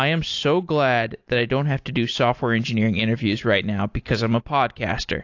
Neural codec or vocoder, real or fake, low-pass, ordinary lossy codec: none; real; 7.2 kHz; AAC, 48 kbps